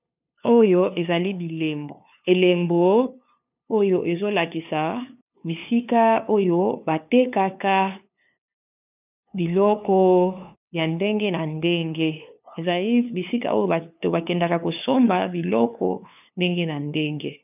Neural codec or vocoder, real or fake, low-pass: codec, 16 kHz, 2 kbps, FunCodec, trained on LibriTTS, 25 frames a second; fake; 3.6 kHz